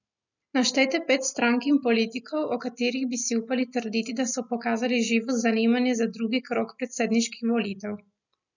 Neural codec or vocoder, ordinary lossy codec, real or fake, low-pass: none; none; real; 7.2 kHz